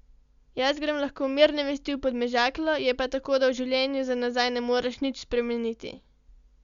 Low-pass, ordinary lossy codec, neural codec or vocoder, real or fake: 7.2 kHz; Opus, 64 kbps; none; real